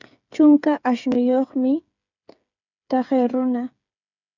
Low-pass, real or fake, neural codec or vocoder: 7.2 kHz; fake; codec, 16 kHz, 8 kbps, FreqCodec, smaller model